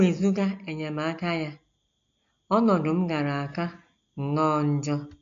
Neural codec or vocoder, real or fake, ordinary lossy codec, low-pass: none; real; AAC, 64 kbps; 7.2 kHz